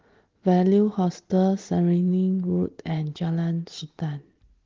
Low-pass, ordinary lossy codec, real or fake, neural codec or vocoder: 7.2 kHz; Opus, 16 kbps; real; none